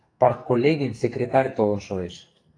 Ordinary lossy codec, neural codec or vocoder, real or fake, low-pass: AAC, 48 kbps; codec, 44.1 kHz, 2.6 kbps, SNAC; fake; 9.9 kHz